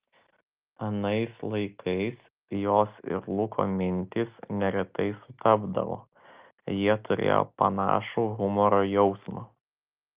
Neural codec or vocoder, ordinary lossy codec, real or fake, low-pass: none; Opus, 24 kbps; real; 3.6 kHz